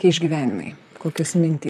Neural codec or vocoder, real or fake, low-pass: vocoder, 44.1 kHz, 128 mel bands, Pupu-Vocoder; fake; 14.4 kHz